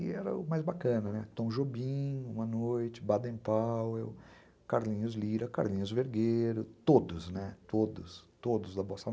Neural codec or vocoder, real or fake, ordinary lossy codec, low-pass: none; real; none; none